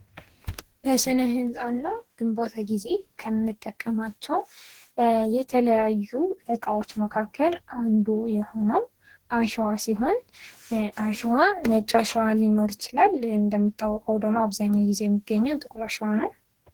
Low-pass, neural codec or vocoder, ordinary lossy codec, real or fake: 19.8 kHz; codec, 44.1 kHz, 2.6 kbps, DAC; Opus, 16 kbps; fake